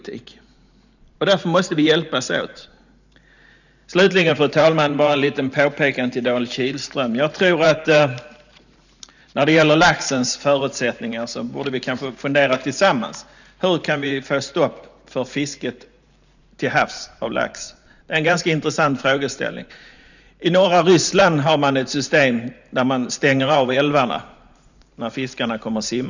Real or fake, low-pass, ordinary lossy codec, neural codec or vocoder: fake; 7.2 kHz; none; vocoder, 44.1 kHz, 128 mel bands every 512 samples, BigVGAN v2